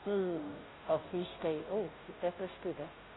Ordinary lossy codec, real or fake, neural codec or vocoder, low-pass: AAC, 16 kbps; fake; codec, 16 kHz, 0.5 kbps, FunCodec, trained on Chinese and English, 25 frames a second; 7.2 kHz